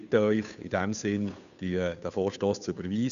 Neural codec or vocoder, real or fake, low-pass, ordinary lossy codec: codec, 16 kHz, 2 kbps, FunCodec, trained on Chinese and English, 25 frames a second; fake; 7.2 kHz; none